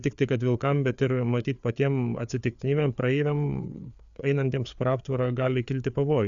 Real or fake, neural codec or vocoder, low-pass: fake; codec, 16 kHz, 4 kbps, FreqCodec, larger model; 7.2 kHz